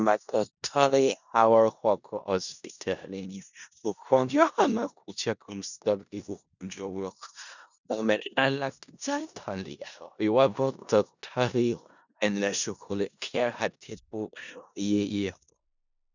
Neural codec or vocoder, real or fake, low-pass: codec, 16 kHz in and 24 kHz out, 0.4 kbps, LongCat-Audio-Codec, four codebook decoder; fake; 7.2 kHz